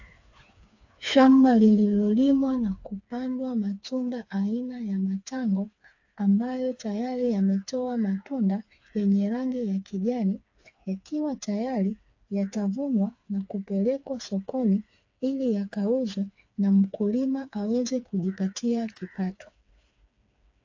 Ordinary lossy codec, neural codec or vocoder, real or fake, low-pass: AAC, 48 kbps; codec, 16 kHz, 4 kbps, FreqCodec, smaller model; fake; 7.2 kHz